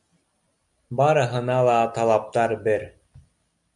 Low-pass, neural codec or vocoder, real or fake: 10.8 kHz; none; real